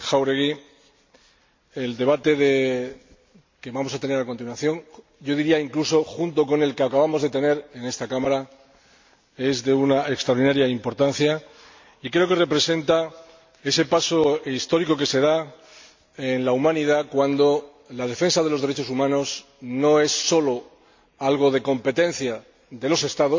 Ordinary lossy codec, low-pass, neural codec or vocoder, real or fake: MP3, 48 kbps; 7.2 kHz; none; real